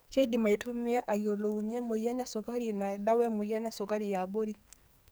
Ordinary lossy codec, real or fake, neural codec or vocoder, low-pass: none; fake; codec, 44.1 kHz, 2.6 kbps, SNAC; none